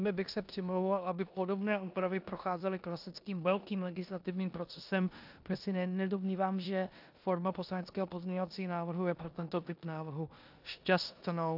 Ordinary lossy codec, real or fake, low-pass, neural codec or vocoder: AAC, 48 kbps; fake; 5.4 kHz; codec, 16 kHz in and 24 kHz out, 0.9 kbps, LongCat-Audio-Codec, four codebook decoder